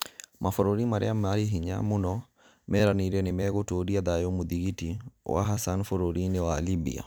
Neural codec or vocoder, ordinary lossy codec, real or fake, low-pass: vocoder, 44.1 kHz, 128 mel bands every 256 samples, BigVGAN v2; none; fake; none